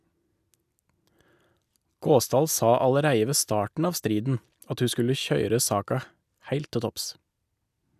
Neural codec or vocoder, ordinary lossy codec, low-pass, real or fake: none; none; 14.4 kHz; real